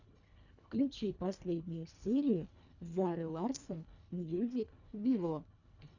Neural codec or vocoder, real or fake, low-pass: codec, 24 kHz, 1.5 kbps, HILCodec; fake; 7.2 kHz